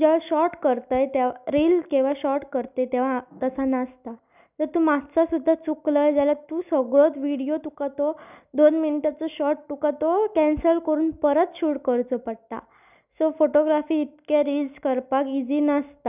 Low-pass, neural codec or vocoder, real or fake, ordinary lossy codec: 3.6 kHz; none; real; none